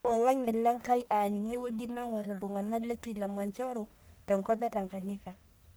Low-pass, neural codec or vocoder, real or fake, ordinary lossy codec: none; codec, 44.1 kHz, 1.7 kbps, Pupu-Codec; fake; none